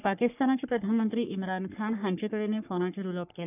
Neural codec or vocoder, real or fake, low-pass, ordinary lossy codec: codec, 44.1 kHz, 3.4 kbps, Pupu-Codec; fake; 3.6 kHz; none